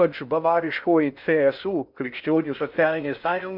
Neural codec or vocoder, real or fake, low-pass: codec, 16 kHz in and 24 kHz out, 0.6 kbps, FocalCodec, streaming, 2048 codes; fake; 5.4 kHz